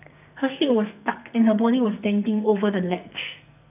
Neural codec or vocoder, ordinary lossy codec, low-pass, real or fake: codec, 44.1 kHz, 2.6 kbps, SNAC; none; 3.6 kHz; fake